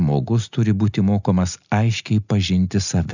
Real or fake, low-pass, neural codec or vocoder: real; 7.2 kHz; none